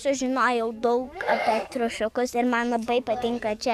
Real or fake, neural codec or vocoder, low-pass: fake; codec, 44.1 kHz, 7.8 kbps, DAC; 14.4 kHz